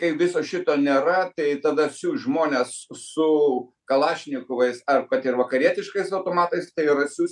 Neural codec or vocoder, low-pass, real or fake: none; 10.8 kHz; real